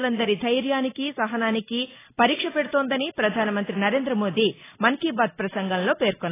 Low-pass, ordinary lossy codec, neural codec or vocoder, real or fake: 3.6 kHz; AAC, 16 kbps; none; real